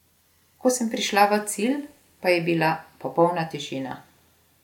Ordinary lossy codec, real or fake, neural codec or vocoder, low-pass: none; real; none; 19.8 kHz